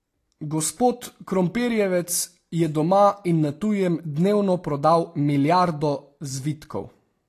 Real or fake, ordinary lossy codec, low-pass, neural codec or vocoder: real; AAC, 48 kbps; 14.4 kHz; none